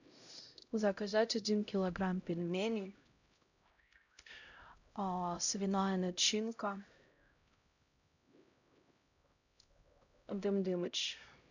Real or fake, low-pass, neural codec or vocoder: fake; 7.2 kHz; codec, 16 kHz, 0.5 kbps, X-Codec, HuBERT features, trained on LibriSpeech